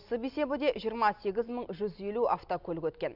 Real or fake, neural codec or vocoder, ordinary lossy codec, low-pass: real; none; none; 5.4 kHz